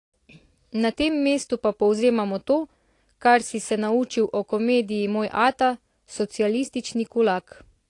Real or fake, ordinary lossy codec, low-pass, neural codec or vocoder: real; AAC, 48 kbps; 10.8 kHz; none